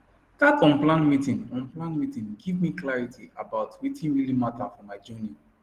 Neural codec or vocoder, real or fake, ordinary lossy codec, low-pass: none; real; Opus, 16 kbps; 14.4 kHz